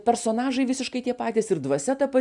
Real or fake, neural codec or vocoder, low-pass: real; none; 10.8 kHz